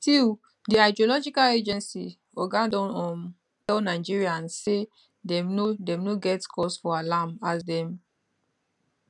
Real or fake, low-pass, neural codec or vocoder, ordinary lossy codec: real; 10.8 kHz; none; none